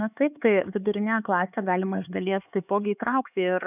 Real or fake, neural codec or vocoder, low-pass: fake; codec, 16 kHz, 4 kbps, X-Codec, HuBERT features, trained on LibriSpeech; 3.6 kHz